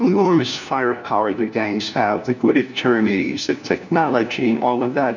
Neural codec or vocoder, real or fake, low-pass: codec, 16 kHz, 1 kbps, FunCodec, trained on LibriTTS, 50 frames a second; fake; 7.2 kHz